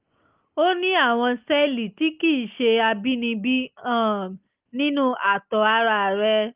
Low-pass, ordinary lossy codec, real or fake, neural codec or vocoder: 3.6 kHz; Opus, 32 kbps; real; none